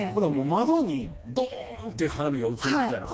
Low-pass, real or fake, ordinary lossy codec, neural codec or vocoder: none; fake; none; codec, 16 kHz, 2 kbps, FreqCodec, smaller model